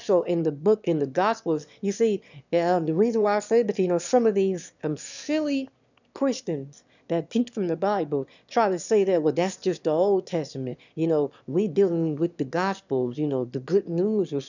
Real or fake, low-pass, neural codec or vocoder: fake; 7.2 kHz; autoencoder, 22.05 kHz, a latent of 192 numbers a frame, VITS, trained on one speaker